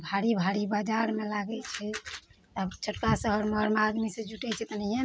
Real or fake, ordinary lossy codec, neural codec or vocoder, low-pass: real; none; none; none